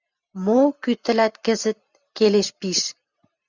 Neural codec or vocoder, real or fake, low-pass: vocoder, 44.1 kHz, 128 mel bands every 512 samples, BigVGAN v2; fake; 7.2 kHz